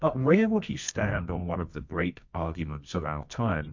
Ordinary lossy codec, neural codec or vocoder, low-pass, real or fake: MP3, 48 kbps; codec, 24 kHz, 0.9 kbps, WavTokenizer, medium music audio release; 7.2 kHz; fake